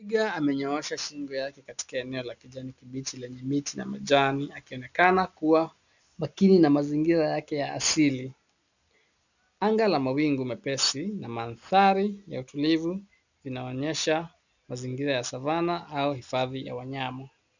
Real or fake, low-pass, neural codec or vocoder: real; 7.2 kHz; none